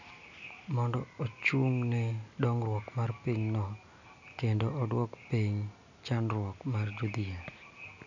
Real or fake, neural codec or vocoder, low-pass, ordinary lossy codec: real; none; 7.2 kHz; none